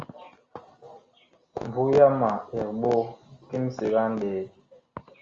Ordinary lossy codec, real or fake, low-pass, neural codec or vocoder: Opus, 32 kbps; real; 7.2 kHz; none